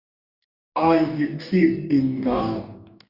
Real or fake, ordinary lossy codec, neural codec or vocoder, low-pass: fake; Opus, 64 kbps; codec, 44.1 kHz, 2.6 kbps, DAC; 5.4 kHz